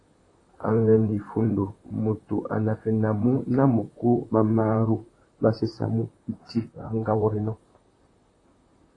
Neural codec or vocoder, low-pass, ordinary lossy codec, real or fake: vocoder, 44.1 kHz, 128 mel bands, Pupu-Vocoder; 10.8 kHz; AAC, 32 kbps; fake